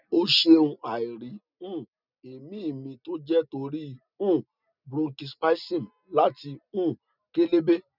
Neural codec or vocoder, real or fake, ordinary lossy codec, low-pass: none; real; none; 5.4 kHz